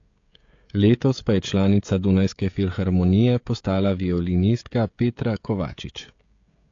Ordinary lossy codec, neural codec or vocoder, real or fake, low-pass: AAC, 48 kbps; codec, 16 kHz, 16 kbps, FreqCodec, smaller model; fake; 7.2 kHz